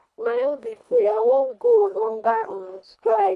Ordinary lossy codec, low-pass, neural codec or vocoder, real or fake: none; none; codec, 24 kHz, 1.5 kbps, HILCodec; fake